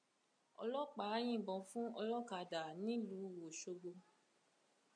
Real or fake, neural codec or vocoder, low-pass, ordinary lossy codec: real; none; 9.9 kHz; MP3, 64 kbps